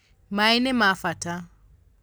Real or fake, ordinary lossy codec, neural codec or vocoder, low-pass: real; none; none; none